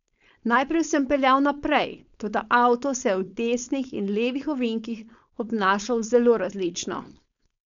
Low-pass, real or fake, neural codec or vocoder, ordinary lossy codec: 7.2 kHz; fake; codec, 16 kHz, 4.8 kbps, FACodec; none